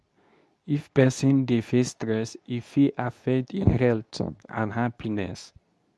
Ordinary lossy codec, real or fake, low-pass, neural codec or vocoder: none; fake; none; codec, 24 kHz, 0.9 kbps, WavTokenizer, medium speech release version 2